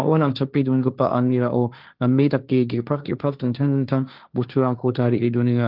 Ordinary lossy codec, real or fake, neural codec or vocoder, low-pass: Opus, 32 kbps; fake; codec, 16 kHz, 1.1 kbps, Voila-Tokenizer; 5.4 kHz